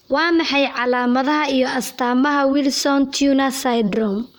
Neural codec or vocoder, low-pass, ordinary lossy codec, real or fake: vocoder, 44.1 kHz, 128 mel bands, Pupu-Vocoder; none; none; fake